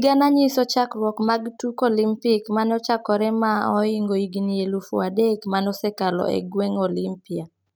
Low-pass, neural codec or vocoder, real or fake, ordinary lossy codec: none; none; real; none